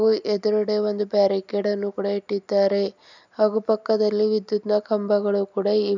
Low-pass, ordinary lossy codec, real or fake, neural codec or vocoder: 7.2 kHz; none; real; none